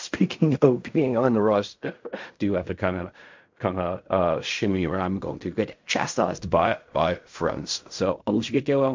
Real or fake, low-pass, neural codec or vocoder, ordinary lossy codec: fake; 7.2 kHz; codec, 16 kHz in and 24 kHz out, 0.4 kbps, LongCat-Audio-Codec, fine tuned four codebook decoder; MP3, 48 kbps